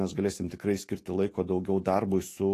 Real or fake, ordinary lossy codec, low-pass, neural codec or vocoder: fake; AAC, 48 kbps; 14.4 kHz; autoencoder, 48 kHz, 128 numbers a frame, DAC-VAE, trained on Japanese speech